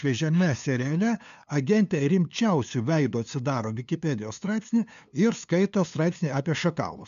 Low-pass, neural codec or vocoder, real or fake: 7.2 kHz; codec, 16 kHz, 2 kbps, FunCodec, trained on LibriTTS, 25 frames a second; fake